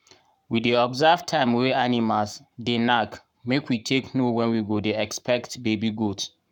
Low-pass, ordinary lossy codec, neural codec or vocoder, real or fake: 19.8 kHz; none; codec, 44.1 kHz, 7.8 kbps, Pupu-Codec; fake